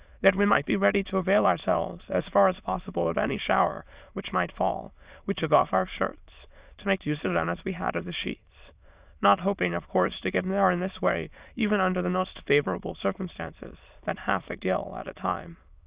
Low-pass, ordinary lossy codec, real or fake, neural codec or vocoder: 3.6 kHz; Opus, 64 kbps; fake; autoencoder, 22.05 kHz, a latent of 192 numbers a frame, VITS, trained on many speakers